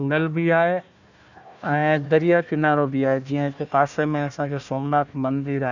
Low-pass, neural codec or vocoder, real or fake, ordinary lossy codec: 7.2 kHz; codec, 16 kHz, 1 kbps, FunCodec, trained on Chinese and English, 50 frames a second; fake; none